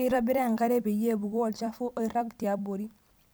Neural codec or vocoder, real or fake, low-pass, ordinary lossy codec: vocoder, 44.1 kHz, 128 mel bands every 256 samples, BigVGAN v2; fake; none; none